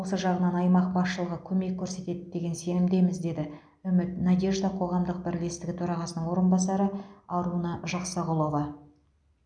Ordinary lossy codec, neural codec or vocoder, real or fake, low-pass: none; none; real; 9.9 kHz